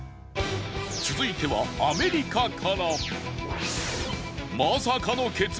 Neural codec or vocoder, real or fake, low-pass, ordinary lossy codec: none; real; none; none